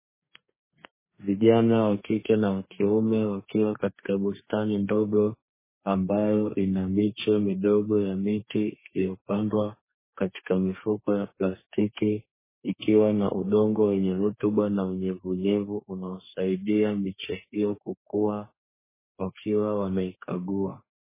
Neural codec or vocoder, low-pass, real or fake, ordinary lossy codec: codec, 32 kHz, 1.9 kbps, SNAC; 3.6 kHz; fake; MP3, 16 kbps